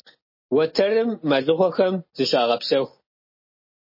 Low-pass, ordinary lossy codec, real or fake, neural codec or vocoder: 5.4 kHz; MP3, 24 kbps; real; none